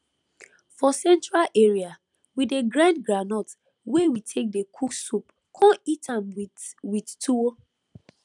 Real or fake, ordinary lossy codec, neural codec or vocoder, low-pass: fake; none; vocoder, 44.1 kHz, 128 mel bands every 256 samples, BigVGAN v2; 10.8 kHz